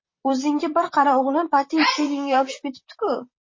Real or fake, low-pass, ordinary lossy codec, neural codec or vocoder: fake; 7.2 kHz; MP3, 32 kbps; vocoder, 44.1 kHz, 128 mel bands, Pupu-Vocoder